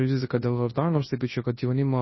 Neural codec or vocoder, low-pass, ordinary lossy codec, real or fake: codec, 24 kHz, 0.9 kbps, WavTokenizer, large speech release; 7.2 kHz; MP3, 24 kbps; fake